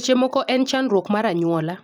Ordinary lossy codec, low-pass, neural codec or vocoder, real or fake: none; 19.8 kHz; vocoder, 44.1 kHz, 128 mel bands every 256 samples, BigVGAN v2; fake